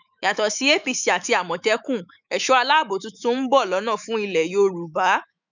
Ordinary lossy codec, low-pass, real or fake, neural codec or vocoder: none; 7.2 kHz; fake; autoencoder, 48 kHz, 128 numbers a frame, DAC-VAE, trained on Japanese speech